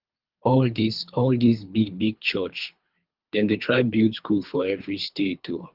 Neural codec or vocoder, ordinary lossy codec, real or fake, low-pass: codec, 24 kHz, 3 kbps, HILCodec; Opus, 32 kbps; fake; 5.4 kHz